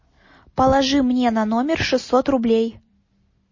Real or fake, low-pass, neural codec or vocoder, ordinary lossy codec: real; 7.2 kHz; none; MP3, 32 kbps